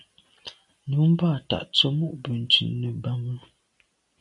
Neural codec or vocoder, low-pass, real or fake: none; 10.8 kHz; real